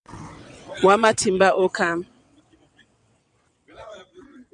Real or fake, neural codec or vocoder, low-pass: fake; vocoder, 22.05 kHz, 80 mel bands, WaveNeXt; 9.9 kHz